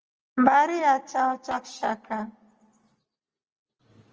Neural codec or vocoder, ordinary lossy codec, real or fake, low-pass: none; Opus, 24 kbps; real; 7.2 kHz